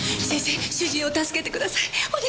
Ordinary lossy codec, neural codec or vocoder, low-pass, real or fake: none; none; none; real